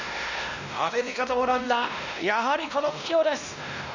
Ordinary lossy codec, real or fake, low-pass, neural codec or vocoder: none; fake; 7.2 kHz; codec, 16 kHz, 1 kbps, X-Codec, WavLM features, trained on Multilingual LibriSpeech